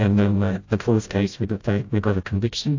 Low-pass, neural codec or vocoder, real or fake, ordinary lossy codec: 7.2 kHz; codec, 16 kHz, 0.5 kbps, FreqCodec, smaller model; fake; AAC, 48 kbps